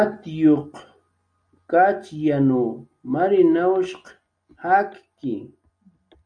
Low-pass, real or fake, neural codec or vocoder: 9.9 kHz; real; none